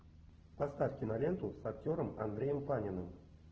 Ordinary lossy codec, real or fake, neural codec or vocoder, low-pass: Opus, 16 kbps; real; none; 7.2 kHz